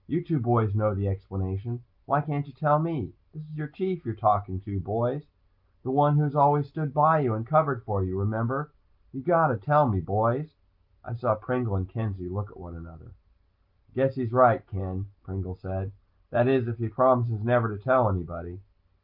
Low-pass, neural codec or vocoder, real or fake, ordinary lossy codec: 5.4 kHz; none; real; Opus, 24 kbps